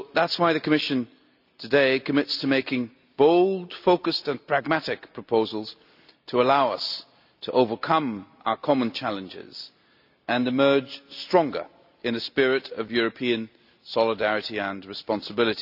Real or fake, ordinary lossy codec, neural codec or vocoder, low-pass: real; none; none; 5.4 kHz